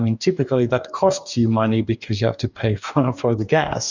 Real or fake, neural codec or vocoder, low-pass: fake; codec, 44.1 kHz, 2.6 kbps, DAC; 7.2 kHz